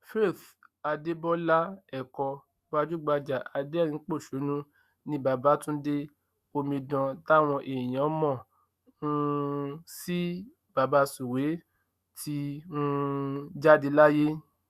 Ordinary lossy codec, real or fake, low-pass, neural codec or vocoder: Opus, 64 kbps; fake; 14.4 kHz; autoencoder, 48 kHz, 128 numbers a frame, DAC-VAE, trained on Japanese speech